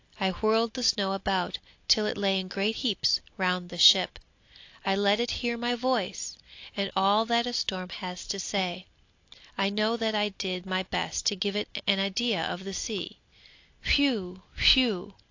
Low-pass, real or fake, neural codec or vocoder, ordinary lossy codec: 7.2 kHz; real; none; AAC, 48 kbps